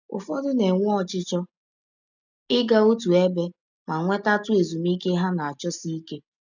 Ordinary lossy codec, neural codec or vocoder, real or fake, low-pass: none; none; real; 7.2 kHz